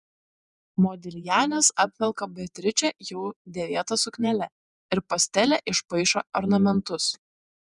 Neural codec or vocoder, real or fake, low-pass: vocoder, 48 kHz, 128 mel bands, Vocos; fake; 10.8 kHz